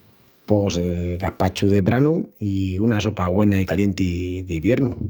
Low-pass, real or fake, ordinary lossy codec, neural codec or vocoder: none; fake; none; codec, 44.1 kHz, 2.6 kbps, SNAC